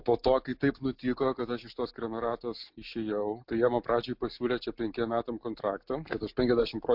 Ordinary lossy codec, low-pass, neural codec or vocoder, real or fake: MP3, 48 kbps; 5.4 kHz; none; real